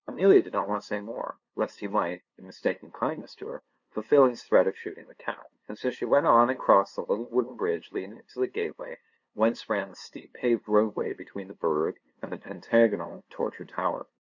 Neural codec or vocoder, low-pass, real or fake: codec, 16 kHz, 2 kbps, FunCodec, trained on LibriTTS, 25 frames a second; 7.2 kHz; fake